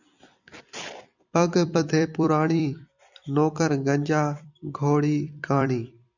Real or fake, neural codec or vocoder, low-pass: fake; vocoder, 44.1 kHz, 128 mel bands every 256 samples, BigVGAN v2; 7.2 kHz